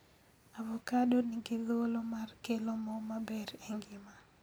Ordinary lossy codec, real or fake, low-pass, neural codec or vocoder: none; real; none; none